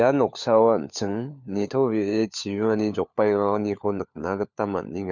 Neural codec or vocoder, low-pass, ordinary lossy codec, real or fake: codec, 16 kHz, 4 kbps, FunCodec, trained on LibriTTS, 50 frames a second; 7.2 kHz; none; fake